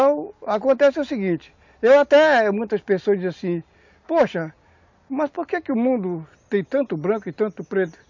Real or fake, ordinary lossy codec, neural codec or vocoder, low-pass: real; MP3, 48 kbps; none; 7.2 kHz